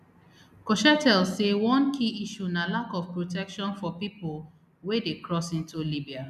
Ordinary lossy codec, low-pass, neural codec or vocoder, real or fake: none; 14.4 kHz; none; real